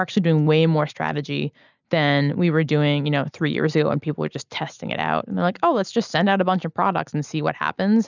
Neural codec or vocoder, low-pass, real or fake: none; 7.2 kHz; real